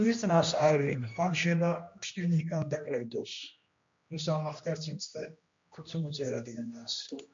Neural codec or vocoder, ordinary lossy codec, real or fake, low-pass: codec, 16 kHz, 1 kbps, X-Codec, HuBERT features, trained on general audio; MP3, 48 kbps; fake; 7.2 kHz